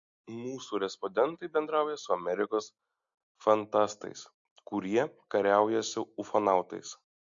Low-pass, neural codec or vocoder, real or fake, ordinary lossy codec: 7.2 kHz; none; real; MP3, 48 kbps